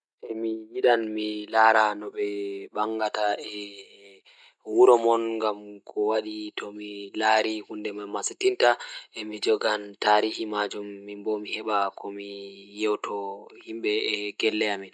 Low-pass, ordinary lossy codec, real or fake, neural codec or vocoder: none; none; real; none